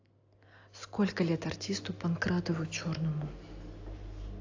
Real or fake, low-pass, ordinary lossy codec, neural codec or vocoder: real; 7.2 kHz; AAC, 48 kbps; none